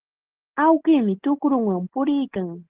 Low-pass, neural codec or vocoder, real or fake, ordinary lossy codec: 3.6 kHz; none; real; Opus, 16 kbps